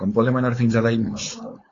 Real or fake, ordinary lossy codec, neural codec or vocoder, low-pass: fake; AAC, 32 kbps; codec, 16 kHz, 4.8 kbps, FACodec; 7.2 kHz